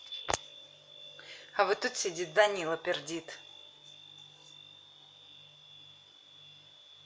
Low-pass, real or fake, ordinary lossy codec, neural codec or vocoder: none; real; none; none